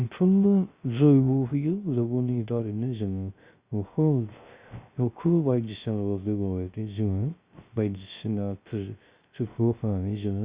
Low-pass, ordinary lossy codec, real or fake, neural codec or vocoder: 3.6 kHz; Opus, 64 kbps; fake; codec, 16 kHz, 0.3 kbps, FocalCodec